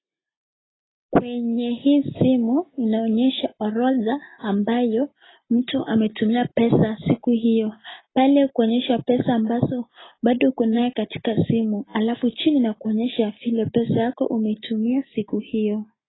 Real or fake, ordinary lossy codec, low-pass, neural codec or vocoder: real; AAC, 16 kbps; 7.2 kHz; none